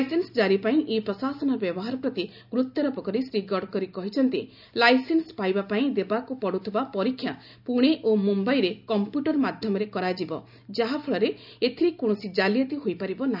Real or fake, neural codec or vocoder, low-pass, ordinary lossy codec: real; none; 5.4 kHz; none